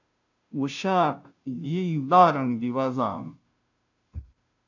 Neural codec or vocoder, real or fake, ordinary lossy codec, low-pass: codec, 16 kHz, 0.5 kbps, FunCodec, trained on Chinese and English, 25 frames a second; fake; AAC, 48 kbps; 7.2 kHz